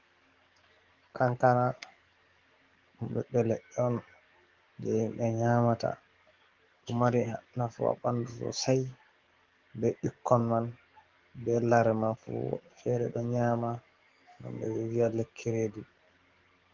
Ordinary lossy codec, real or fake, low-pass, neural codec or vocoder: Opus, 16 kbps; fake; 7.2 kHz; codec, 44.1 kHz, 7.8 kbps, DAC